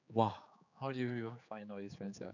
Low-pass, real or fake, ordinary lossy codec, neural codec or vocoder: 7.2 kHz; fake; none; codec, 16 kHz, 4 kbps, X-Codec, HuBERT features, trained on general audio